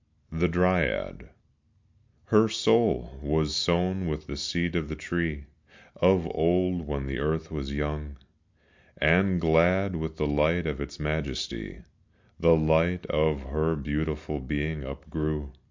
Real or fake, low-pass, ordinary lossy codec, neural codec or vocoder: real; 7.2 kHz; MP3, 64 kbps; none